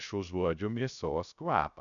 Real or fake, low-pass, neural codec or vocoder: fake; 7.2 kHz; codec, 16 kHz, 0.3 kbps, FocalCodec